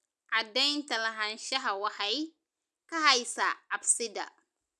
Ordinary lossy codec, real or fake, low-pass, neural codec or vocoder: none; real; none; none